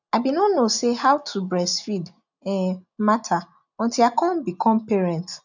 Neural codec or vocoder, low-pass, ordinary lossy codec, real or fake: none; 7.2 kHz; none; real